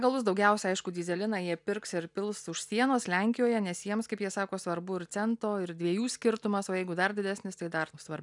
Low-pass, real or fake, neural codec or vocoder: 10.8 kHz; real; none